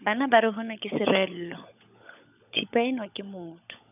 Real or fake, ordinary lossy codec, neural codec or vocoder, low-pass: fake; none; codec, 16 kHz, 16 kbps, FunCodec, trained on LibriTTS, 50 frames a second; 3.6 kHz